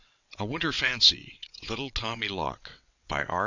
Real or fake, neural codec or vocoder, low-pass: fake; vocoder, 22.05 kHz, 80 mel bands, WaveNeXt; 7.2 kHz